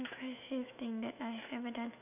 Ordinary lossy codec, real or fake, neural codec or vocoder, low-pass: none; fake; autoencoder, 48 kHz, 128 numbers a frame, DAC-VAE, trained on Japanese speech; 3.6 kHz